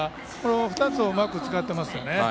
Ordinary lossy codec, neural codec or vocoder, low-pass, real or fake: none; none; none; real